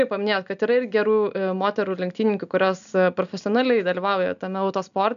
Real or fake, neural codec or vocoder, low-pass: real; none; 7.2 kHz